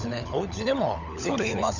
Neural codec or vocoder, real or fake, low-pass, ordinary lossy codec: codec, 16 kHz, 16 kbps, FunCodec, trained on LibriTTS, 50 frames a second; fake; 7.2 kHz; none